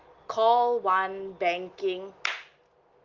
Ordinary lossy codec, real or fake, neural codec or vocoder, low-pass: Opus, 32 kbps; real; none; 7.2 kHz